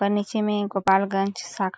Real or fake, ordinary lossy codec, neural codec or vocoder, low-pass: real; none; none; none